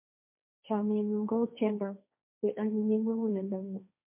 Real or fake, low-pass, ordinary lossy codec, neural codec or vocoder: fake; 3.6 kHz; MP3, 32 kbps; codec, 16 kHz, 1.1 kbps, Voila-Tokenizer